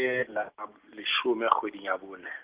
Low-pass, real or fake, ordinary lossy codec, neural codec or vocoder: 3.6 kHz; real; Opus, 16 kbps; none